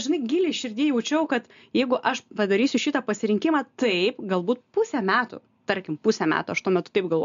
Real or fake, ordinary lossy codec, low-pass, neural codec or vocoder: real; MP3, 64 kbps; 7.2 kHz; none